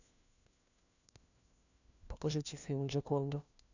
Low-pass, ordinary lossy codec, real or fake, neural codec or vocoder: 7.2 kHz; none; fake; codec, 16 kHz, 1 kbps, FunCodec, trained on LibriTTS, 50 frames a second